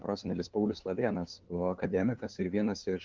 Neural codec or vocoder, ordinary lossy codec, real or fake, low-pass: codec, 16 kHz, 2 kbps, FunCodec, trained on LibriTTS, 25 frames a second; Opus, 32 kbps; fake; 7.2 kHz